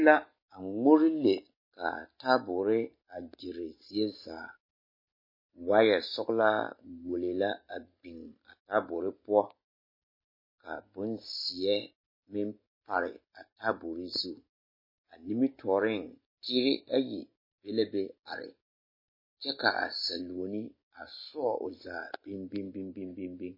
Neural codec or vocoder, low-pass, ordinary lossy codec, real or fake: none; 5.4 kHz; MP3, 24 kbps; real